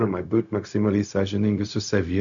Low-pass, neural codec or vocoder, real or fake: 7.2 kHz; codec, 16 kHz, 0.4 kbps, LongCat-Audio-Codec; fake